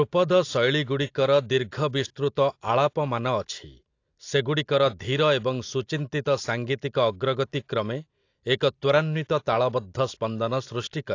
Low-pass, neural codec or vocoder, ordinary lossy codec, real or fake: 7.2 kHz; none; AAC, 48 kbps; real